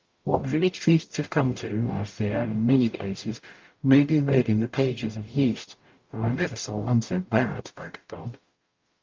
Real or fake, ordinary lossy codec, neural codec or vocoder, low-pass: fake; Opus, 24 kbps; codec, 44.1 kHz, 0.9 kbps, DAC; 7.2 kHz